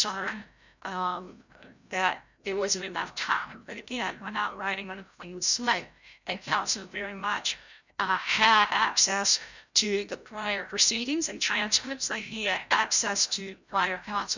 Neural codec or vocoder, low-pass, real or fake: codec, 16 kHz, 0.5 kbps, FreqCodec, larger model; 7.2 kHz; fake